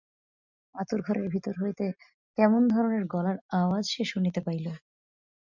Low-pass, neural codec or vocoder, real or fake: 7.2 kHz; none; real